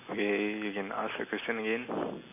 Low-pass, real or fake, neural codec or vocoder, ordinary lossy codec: 3.6 kHz; real; none; MP3, 24 kbps